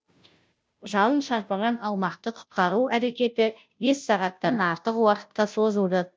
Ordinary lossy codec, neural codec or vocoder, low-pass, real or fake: none; codec, 16 kHz, 0.5 kbps, FunCodec, trained on Chinese and English, 25 frames a second; none; fake